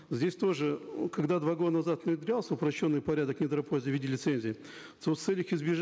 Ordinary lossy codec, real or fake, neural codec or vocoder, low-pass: none; real; none; none